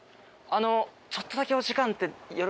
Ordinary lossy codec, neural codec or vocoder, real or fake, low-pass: none; none; real; none